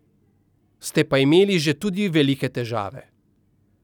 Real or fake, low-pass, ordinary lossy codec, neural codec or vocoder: real; 19.8 kHz; none; none